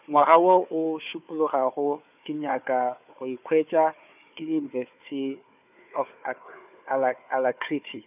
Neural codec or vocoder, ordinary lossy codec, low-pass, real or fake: codec, 16 kHz, 4 kbps, FunCodec, trained on Chinese and English, 50 frames a second; none; 3.6 kHz; fake